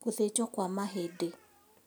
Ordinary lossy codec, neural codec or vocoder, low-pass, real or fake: none; none; none; real